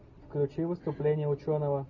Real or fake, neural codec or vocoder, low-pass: real; none; 7.2 kHz